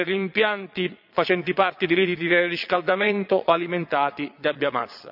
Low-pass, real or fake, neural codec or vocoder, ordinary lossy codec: 5.4 kHz; fake; vocoder, 22.05 kHz, 80 mel bands, Vocos; none